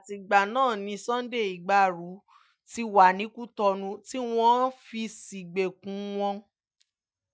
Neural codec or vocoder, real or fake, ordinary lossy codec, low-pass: none; real; none; none